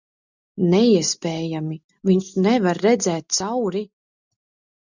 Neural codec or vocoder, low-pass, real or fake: none; 7.2 kHz; real